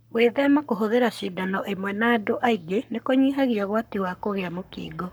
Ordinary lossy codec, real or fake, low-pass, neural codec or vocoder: none; fake; none; codec, 44.1 kHz, 7.8 kbps, Pupu-Codec